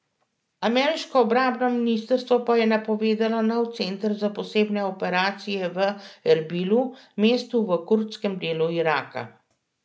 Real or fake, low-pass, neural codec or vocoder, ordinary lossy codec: real; none; none; none